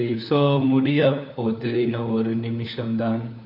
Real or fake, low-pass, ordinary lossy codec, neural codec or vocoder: fake; 5.4 kHz; none; codec, 16 kHz, 4 kbps, FunCodec, trained on LibriTTS, 50 frames a second